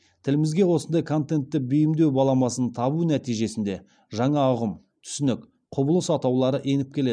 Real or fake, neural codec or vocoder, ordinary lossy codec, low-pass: real; none; none; 9.9 kHz